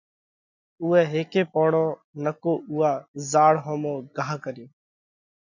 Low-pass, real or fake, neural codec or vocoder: 7.2 kHz; real; none